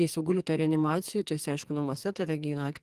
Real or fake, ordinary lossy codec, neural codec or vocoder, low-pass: fake; Opus, 24 kbps; codec, 44.1 kHz, 2.6 kbps, SNAC; 14.4 kHz